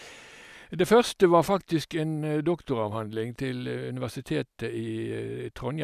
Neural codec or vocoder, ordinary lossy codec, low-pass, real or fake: none; none; 14.4 kHz; real